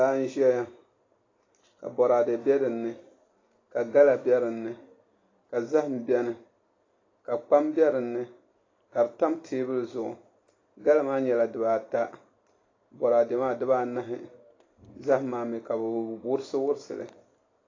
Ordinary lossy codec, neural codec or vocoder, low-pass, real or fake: AAC, 32 kbps; none; 7.2 kHz; real